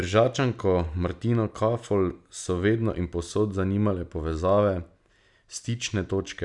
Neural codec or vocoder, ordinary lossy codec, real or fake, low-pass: none; none; real; 10.8 kHz